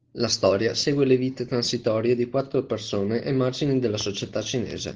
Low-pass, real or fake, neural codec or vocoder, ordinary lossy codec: 7.2 kHz; real; none; Opus, 16 kbps